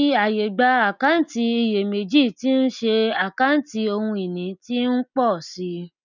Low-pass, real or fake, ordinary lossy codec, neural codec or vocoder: 7.2 kHz; real; none; none